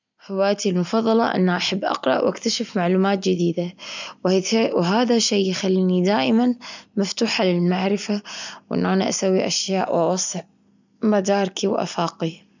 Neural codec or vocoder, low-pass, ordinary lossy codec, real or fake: none; 7.2 kHz; none; real